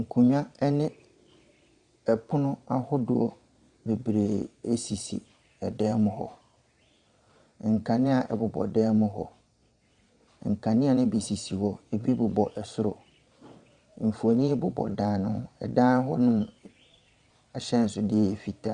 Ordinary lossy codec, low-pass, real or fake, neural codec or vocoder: Opus, 64 kbps; 9.9 kHz; fake; vocoder, 22.05 kHz, 80 mel bands, Vocos